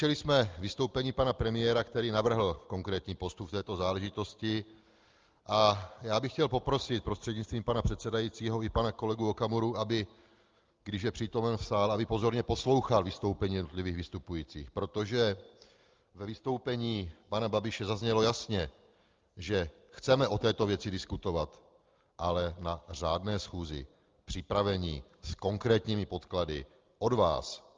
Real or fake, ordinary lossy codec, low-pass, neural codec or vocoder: real; Opus, 32 kbps; 7.2 kHz; none